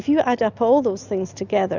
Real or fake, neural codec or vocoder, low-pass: real; none; 7.2 kHz